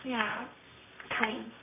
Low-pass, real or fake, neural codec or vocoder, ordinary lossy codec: 3.6 kHz; fake; codec, 24 kHz, 0.9 kbps, WavTokenizer, medium music audio release; AAC, 16 kbps